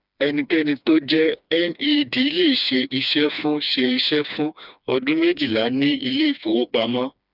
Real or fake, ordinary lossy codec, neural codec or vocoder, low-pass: fake; none; codec, 16 kHz, 2 kbps, FreqCodec, smaller model; 5.4 kHz